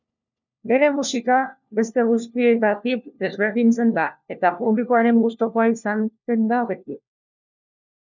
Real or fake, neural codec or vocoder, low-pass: fake; codec, 16 kHz, 1 kbps, FunCodec, trained on LibriTTS, 50 frames a second; 7.2 kHz